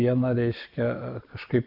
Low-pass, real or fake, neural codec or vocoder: 5.4 kHz; real; none